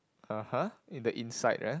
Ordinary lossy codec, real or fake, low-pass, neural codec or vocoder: none; real; none; none